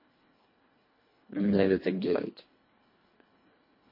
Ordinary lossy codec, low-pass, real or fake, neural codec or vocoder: MP3, 24 kbps; 5.4 kHz; fake; codec, 24 kHz, 1.5 kbps, HILCodec